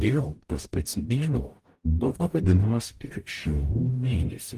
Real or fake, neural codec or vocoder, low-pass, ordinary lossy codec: fake; codec, 44.1 kHz, 0.9 kbps, DAC; 14.4 kHz; Opus, 24 kbps